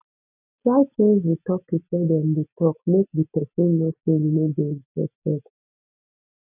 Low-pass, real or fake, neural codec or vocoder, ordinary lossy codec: 3.6 kHz; real; none; none